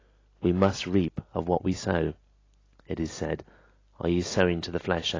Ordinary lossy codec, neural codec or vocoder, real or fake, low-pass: AAC, 32 kbps; none; real; 7.2 kHz